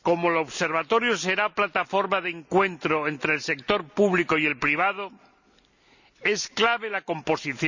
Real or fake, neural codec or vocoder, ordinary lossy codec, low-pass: real; none; none; 7.2 kHz